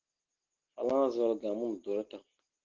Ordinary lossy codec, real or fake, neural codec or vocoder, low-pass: Opus, 16 kbps; real; none; 7.2 kHz